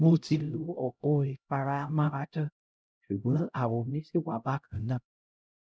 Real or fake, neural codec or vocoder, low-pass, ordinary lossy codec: fake; codec, 16 kHz, 0.5 kbps, X-Codec, HuBERT features, trained on LibriSpeech; none; none